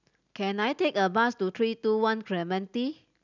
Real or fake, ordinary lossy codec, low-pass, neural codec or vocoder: real; none; 7.2 kHz; none